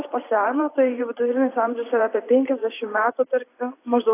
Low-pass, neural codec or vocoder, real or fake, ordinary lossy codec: 3.6 kHz; vocoder, 44.1 kHz, 128 mel bands every 512 samples, BigVGAN v2; fake; AAC, 24 kbps